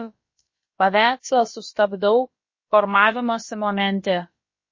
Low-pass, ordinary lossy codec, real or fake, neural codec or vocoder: 7.2 kHz; MP3, 32 kbps; fake; codec, 16 kHz, about 1 kbps, DyCAST, with the encoder's durations